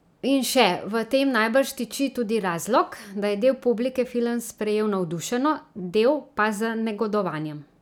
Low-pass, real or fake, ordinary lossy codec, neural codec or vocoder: 19.8 kHz; real; none; none